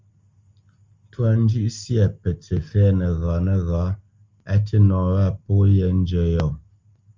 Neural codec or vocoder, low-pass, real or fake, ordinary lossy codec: none; 7.2 kHz; real; Opus, 32 kbps